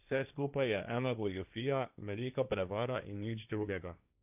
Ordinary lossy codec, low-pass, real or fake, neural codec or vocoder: none; 3.6 kHz; fake; codec, 16 kHz, 1.1 kbps, Voila-Tokenizer